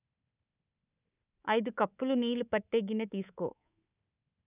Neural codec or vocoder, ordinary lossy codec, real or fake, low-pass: codec, 24 kHz, 3.1 kbps, DualCodec; none; fake; 3.6 kHz